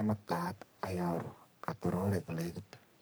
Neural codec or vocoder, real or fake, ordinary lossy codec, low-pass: codec, 44.1 kHz, 3.4 kbps, Pupu-Codec; fake; none; none